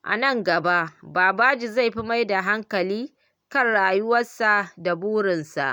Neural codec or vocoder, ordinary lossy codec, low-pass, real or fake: none; none; none; real